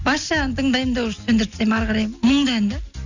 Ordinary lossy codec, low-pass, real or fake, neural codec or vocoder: none; 7.2 kHz; real; none